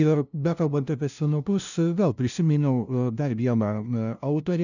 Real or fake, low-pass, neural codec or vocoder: fake; 7.2 kHz; codec, 16 kHz, 0.5 kbps, FunCodec, trained on LibriTTS, 25 frames a second